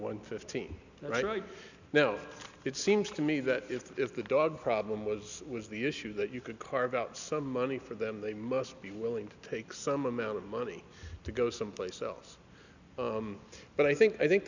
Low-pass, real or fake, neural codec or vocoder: 7.2 kHz; real; none